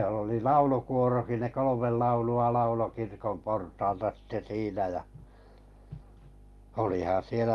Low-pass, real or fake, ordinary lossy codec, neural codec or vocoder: 19.8 kHz; real; Opus, 32 kbps; none